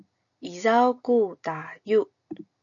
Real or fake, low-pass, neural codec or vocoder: real; 7.2 kHz; none